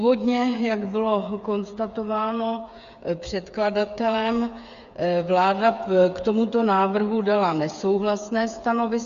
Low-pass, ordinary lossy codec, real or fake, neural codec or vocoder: 7.2 kHz; Opus, 64 kbps; fake; codec, 16 kHz, 8 kbps, FreqCodec, smaller model